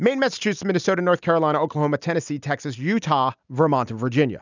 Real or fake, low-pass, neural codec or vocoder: real; 7.2 kHz; none